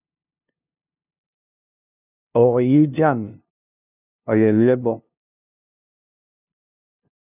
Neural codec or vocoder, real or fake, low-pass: codec, 16 kHz, 0.5 kbps, FunCodec, trained on LibriTTS, 25 frames a second; fake; 3.6 kHz